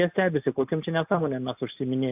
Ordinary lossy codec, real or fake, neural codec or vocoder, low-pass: AAC, 32 kbps; real; none; 3.6 kHz